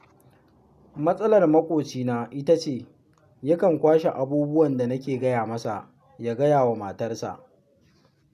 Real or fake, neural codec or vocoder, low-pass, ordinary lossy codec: real; none; 14.4 kHz; none